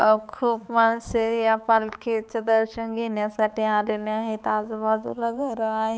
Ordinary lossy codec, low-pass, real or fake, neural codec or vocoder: none; none; fake; codec, 16 kHz, 4 kbps, X-Codec, HuBERT features, trained on balanced general audio